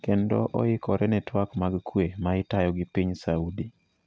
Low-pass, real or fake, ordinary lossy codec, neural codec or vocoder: none; real; none; none